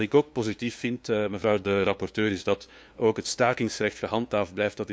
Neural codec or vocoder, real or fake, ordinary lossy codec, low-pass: codec, 16 kHz, 2 kbps, FunCodec, trained on LibriTTS, 25 frames a second; fake; none; none